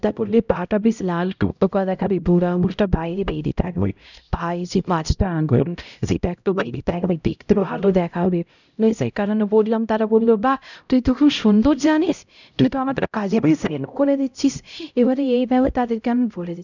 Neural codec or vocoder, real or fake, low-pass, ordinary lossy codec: codec, 16 kHz, 0.5 kbps, X-Codec, HuBERT features, trained on LibriSpeech; fake; 7.2 kHz; none